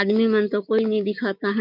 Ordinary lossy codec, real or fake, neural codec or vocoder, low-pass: none; fake; codec, 16 kHz, 6 kbps, DAC; 5.4 kHz